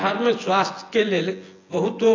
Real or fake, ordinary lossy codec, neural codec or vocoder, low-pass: fake; AAC, 32 kbps; vocoder, 24 kHz, 100 mel bands, Vocos; 7.2 kHz